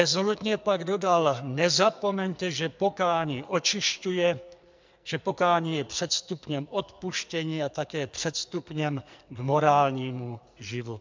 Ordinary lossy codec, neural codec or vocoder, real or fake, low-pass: MP3, 64 kbps; codec, 44.1 kHz, 2.6 kbps, SNAC; fake; 7.2 kHz